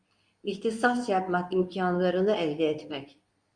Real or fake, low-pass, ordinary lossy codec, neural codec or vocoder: fake; 9.9 kHz; Opus, 64 kbps; codec, 24 kHz, 0.9 kbps, WavTokenizer, medium speech release version 2